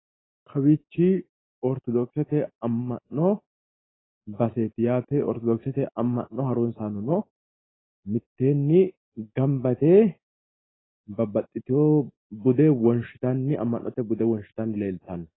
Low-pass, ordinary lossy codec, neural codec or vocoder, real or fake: 7.2 kHz; AAC, 16 kbps; none; real